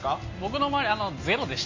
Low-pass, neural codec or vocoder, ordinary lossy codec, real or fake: 7.2 kHz; none; MP3, 32 kbps; real